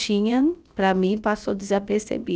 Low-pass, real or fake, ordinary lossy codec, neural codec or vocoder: none; fake; none; codec, 16 kHz, about 1 kbps, DyCAST, with the encoder's durations